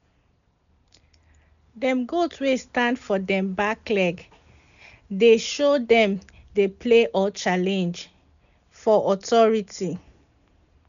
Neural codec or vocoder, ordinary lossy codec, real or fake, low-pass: none; none; real; 7.2 kHz